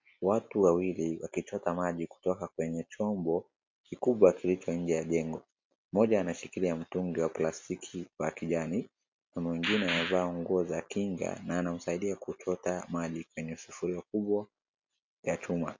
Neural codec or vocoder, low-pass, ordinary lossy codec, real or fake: none; 7.2 kHz; MP3, 48 kbps; real